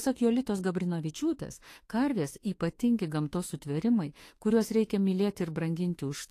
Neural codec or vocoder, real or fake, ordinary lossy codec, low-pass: autoencoder, 48 kHz, 32 numbers a frame, DAC-VAE, trained on Japanese speech; fake; AAC, 48 kbps; 14.4 kHz